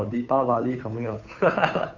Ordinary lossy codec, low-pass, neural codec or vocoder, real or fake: none; 7.2 kHz; codec, 16 kHz, 2 kbps, FunCodec, trained on Chinese and English, 25 frames a second; fake